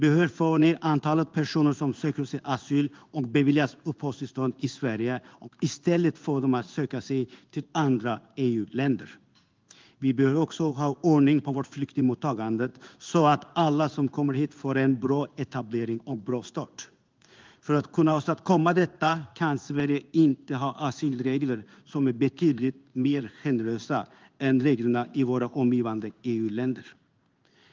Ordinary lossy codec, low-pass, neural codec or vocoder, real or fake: Opus, 24 kbps; 7.2 kHz; codec, 16 kHz in and 24 kHz out, 1 kbps, XY-Tokenizer; fake